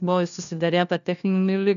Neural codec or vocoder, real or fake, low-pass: codec, 16 kHz, 1 kbps, FunCodec, trained on LibriTTS, 50 frames a second; fake; 7.2 kHz